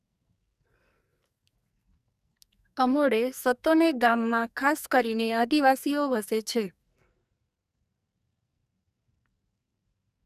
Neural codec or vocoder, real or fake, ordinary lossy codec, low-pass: codec, 44.1 kHz, 2.6 kbps, SNAC; fake; none; 14.4 kHz